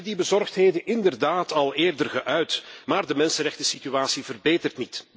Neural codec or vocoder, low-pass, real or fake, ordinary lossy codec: none; none; real; none